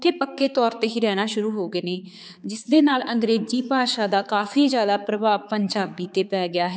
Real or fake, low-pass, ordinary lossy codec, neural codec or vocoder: fake; none; none; codec, 16 kHz, 4 kbps, X-Codec, HuBERT features, trained on balanced general audio